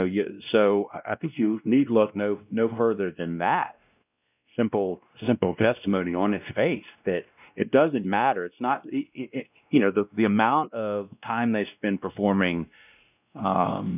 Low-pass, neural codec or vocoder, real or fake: 3.6 kHz; codec, 16 kHz, 1 kbps, X-Codec, WavLM features, trained on Multilingual LibriSpeech; fake